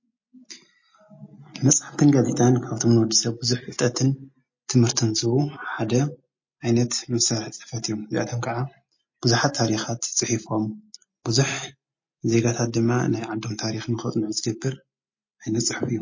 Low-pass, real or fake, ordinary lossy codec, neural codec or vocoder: 7.2 kHz; real; MP3, 32 kbps; none